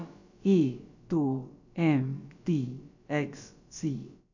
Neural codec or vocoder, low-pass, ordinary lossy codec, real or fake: codec, 16 kHz, about 1 kbps, DyCAST, with the encoder's durations; 7.2 kHz; none; fake